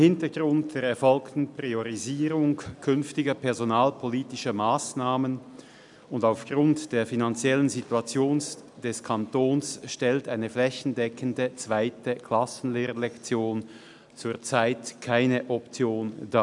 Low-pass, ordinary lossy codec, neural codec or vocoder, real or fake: 10.8 kHz; none; none; real